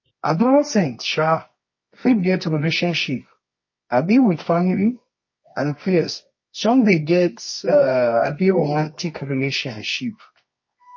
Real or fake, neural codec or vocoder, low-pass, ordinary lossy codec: fake; codec, 24 kHz, 0.9 kbps, WavTokenizer, medium music audio release; 7.2 kHz; MP3, 32 kbps